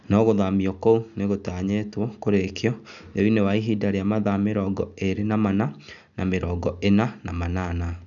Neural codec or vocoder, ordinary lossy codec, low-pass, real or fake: none; none; 7.2 kHz; real